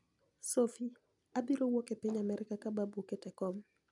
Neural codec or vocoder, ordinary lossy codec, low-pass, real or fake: none; none; 10.8 kHz; real